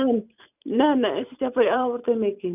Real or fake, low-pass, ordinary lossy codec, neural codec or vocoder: fake; 3.6 kHz; none; vocoder, 44.1 kHz, 128 mel bands every 512 samples, BigVGAN v2